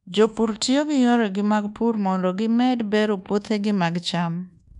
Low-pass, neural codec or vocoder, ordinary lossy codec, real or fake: 10.8 kHz; codec, 24 kHz, 1.2 kbps, DualCodec; none; fake